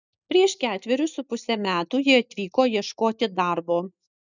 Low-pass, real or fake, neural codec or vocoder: 7.2 kHz; real; none